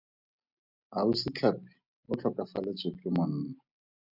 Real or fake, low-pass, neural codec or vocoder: real; 5.4 kHz; none